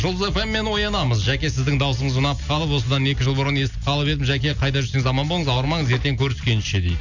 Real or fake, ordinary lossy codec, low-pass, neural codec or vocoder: real; none; 7.2 kHz; none